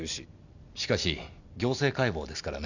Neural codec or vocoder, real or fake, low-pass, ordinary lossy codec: none; real; 7.2 kHz; none